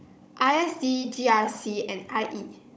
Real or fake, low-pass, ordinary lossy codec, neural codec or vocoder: fake; none; none; codec, 16 kHz, 16 kbps, FunCodec, trained on Chinese and English, 50 frames a second